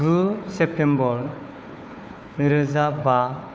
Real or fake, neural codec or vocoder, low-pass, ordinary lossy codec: fake; codec, 16 kHz, 4 kbps, FunCodec, trained on Chinese and English, 50 frames a second; none; none